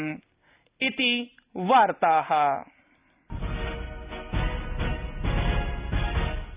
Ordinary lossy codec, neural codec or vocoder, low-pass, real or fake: Opus, 64 kbps; none; 3.6 kHz; real